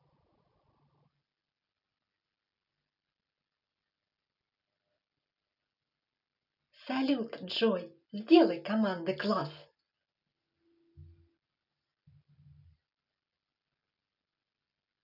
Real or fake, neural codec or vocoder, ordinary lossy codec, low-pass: real; none; none; 5.4 kHz